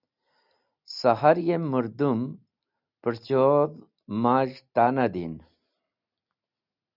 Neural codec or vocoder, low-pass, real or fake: none; 5.4 kHz; real